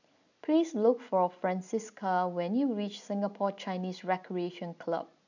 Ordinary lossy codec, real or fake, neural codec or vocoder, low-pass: none; real; none; 7.2 kHz